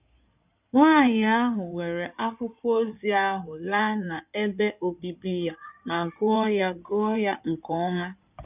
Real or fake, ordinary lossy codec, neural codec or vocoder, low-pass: fake; none; codec, 16 kHz in and 24 kHz out, 2.2 kbps, FireRedTTS-2 codec; 3.6 kHz